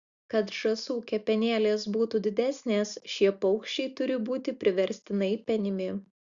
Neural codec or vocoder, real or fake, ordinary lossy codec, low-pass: none; real; Opus, 64 kbps; 7.2 kHz